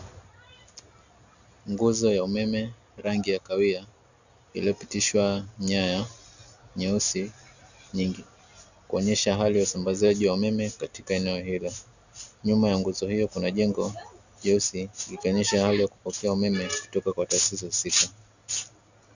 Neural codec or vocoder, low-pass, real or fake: none; 7.2 kHz; real